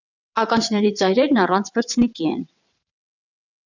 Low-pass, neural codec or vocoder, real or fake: 7.2 kHz; vocoder, 22.05 kHz, 80 mel bands, WaveNeXt; fake